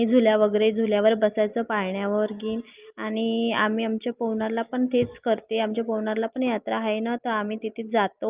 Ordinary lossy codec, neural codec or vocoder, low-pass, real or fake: Opus, 24 kbps; none; 3.6 kHz; real